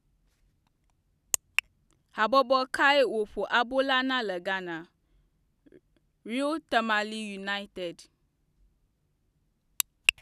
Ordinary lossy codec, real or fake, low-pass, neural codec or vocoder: none; real; 14.4 kHz; none